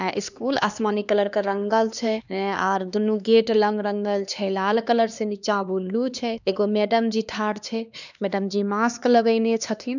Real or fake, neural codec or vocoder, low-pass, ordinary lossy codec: fake; codec, 16 kHz, 2 kbps, X-Codec, HuBERT features, trained on LibriSpeech; 7.2 kHz; none